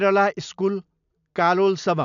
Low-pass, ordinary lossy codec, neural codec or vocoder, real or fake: 7.2 kHz; none; none; real